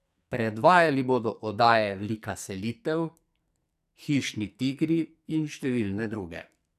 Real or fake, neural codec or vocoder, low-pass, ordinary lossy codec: fake; codec, 44.1 kHz, 2.6 kbps, SNAC; 14.4 kHz; none